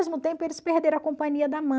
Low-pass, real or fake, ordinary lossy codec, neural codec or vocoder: none; real; none; none